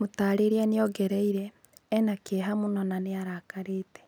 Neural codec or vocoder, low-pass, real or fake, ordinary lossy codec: none; none; real; none